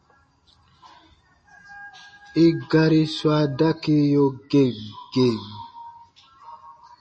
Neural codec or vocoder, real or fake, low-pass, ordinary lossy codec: none; real; 9.9 kHz; MP3, 32 kbps